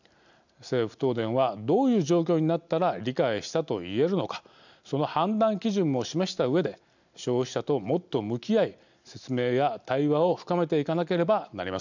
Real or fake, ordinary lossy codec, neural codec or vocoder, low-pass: real; none; none; 7.2 kHz